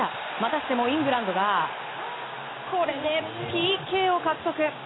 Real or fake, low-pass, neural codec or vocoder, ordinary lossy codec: real; 7.2 kHz; none; AAC, 16 kbps